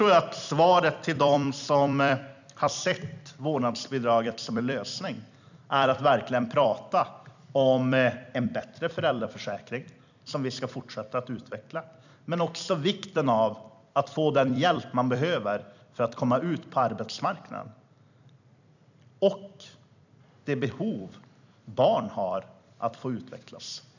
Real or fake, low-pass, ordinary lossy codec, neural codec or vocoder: fake; 7.2 kHz; none; vocoder, 44.1 kHz, 128 mel bands every 256 samples, BigVGAN v2